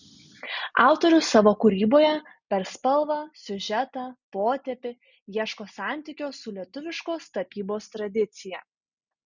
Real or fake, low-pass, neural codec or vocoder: real; 7.2 kHz; none